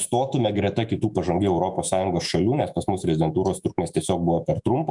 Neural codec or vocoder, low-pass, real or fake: autoencoder, 48 kHz, 128 numbers a frame, DAC-VAE, trained on Japanese speech; 10.8 kHz; fake